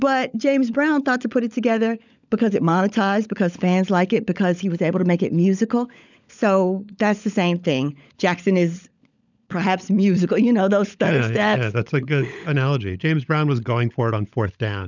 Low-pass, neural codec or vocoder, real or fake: 7.2 kHz; codec, 16 kHz, 16 kbps, FunCodec, trained on LibriTTS, 50 frames a second; fake